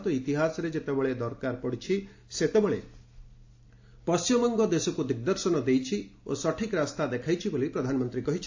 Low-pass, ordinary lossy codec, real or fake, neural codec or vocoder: 7.2 kHz; AAC, 48 kbps; real; none